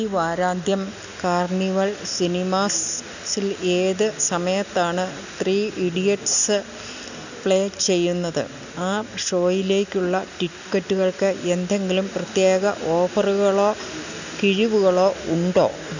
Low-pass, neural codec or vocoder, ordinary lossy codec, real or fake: 7.2 kHz; none; none; real